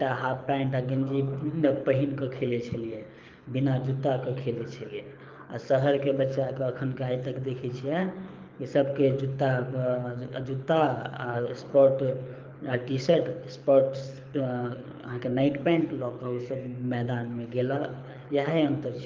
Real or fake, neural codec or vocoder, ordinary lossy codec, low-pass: fake; codec, 24 kHz, 6 kbps, HILCodec; Opus, 24 kbps; 7.2 kHz